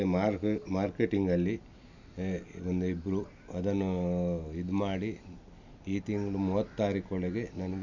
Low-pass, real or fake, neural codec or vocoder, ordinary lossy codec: 7.2 kHz; real; none; none